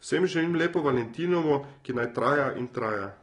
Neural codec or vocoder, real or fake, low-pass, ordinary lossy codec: none; real; 10.8 kHz; AAC, 32 kbps